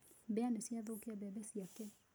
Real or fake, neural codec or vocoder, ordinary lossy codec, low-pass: real; none; none; none